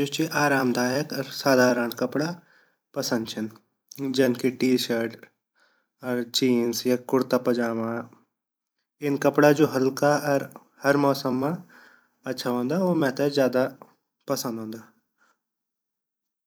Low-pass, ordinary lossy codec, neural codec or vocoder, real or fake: none; none; vocoder, 44.1 kHz, 128 mel bands every 256 samples, BigVGAN v2; fake